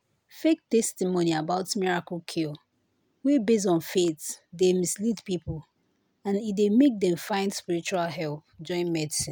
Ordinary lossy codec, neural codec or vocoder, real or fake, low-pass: none; none; real; none